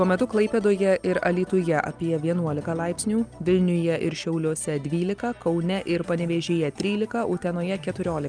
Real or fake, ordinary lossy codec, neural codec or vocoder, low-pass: real; Opus, 32 kbps; none; 9.9 kHz